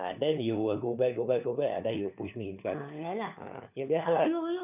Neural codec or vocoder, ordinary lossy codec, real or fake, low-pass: codec, 16 kHz, 4 kbps, FunCodec, trained on LibriTTS, 50 frames a second; none; fake; 3.6 kHz